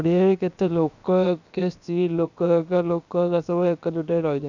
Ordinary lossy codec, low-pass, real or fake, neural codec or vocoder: none; 7.2 kHz; fake; codec, 16 kHz, about 1 kbps, DyCAST, with the encoder's durations